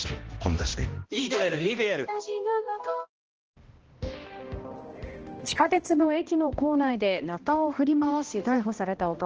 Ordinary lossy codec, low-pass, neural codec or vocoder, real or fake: Opus, 16 kbps; 7.2 kHz; codec, 16 kHz, 1 kbps, X-Codec, HuBERT features, trained on balanced general audio; fake